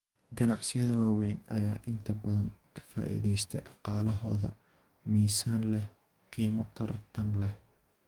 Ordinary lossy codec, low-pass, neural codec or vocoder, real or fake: Opus, 32 kbps; 19.8 kHz; codec, 44.1 kHz, 2.6 kbps, DAC; fake